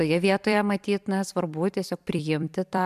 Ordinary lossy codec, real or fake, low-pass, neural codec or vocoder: MP3, 96 kbps; fake; 14.4 kHz; vocoder, 44.1 kHz, 128 mel bands every 256 samples, BigVGAN v2